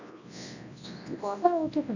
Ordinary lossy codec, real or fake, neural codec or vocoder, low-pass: none; fake; codec, 24 kHz, 0.9 kbps, WavTokenizer, large speech release; 7.2 kHz